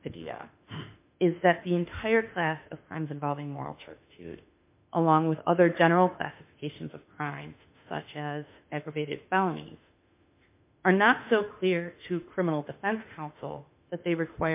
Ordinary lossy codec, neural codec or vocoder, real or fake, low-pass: MP3, 32 kbps; autoencoder, 48 kHz, 32 numbers a frame, DAC-VAE, trained on Japanese speech; fake; 3.6 kHz